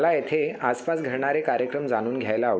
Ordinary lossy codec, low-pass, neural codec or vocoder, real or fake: none; none; none; real